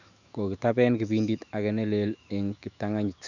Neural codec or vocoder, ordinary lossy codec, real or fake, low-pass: autoencoder, 48 kHz, 128 numbers a frame, DAC-VAE, trained on Japanese speech; none; fake; 7.2 kHz